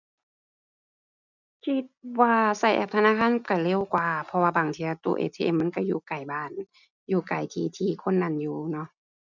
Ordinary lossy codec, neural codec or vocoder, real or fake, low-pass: none; none; real; 7.2 kHz